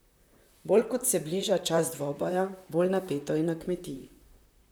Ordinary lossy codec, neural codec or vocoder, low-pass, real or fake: none; vocoder, 44.1 kHz, 128 mel bands, Pupu-Vocoder; none; fake